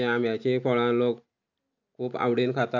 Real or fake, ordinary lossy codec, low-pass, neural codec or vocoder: real; none; 7.2 kHz; none